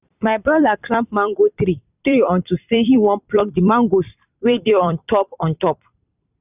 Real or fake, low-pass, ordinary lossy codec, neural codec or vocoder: fake; 3.6 kHz; none; vocoder, 44.1 kHz, 128 mel bands every 256 samples, BigVGAN v2